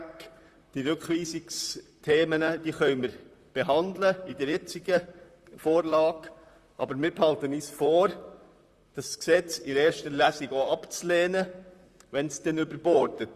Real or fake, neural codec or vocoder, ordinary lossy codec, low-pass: fake; vocoder, 44.1 kHz, 128 mel bands, Pupu-Vocoder; Opus, 64 kbps; 14.4 kHz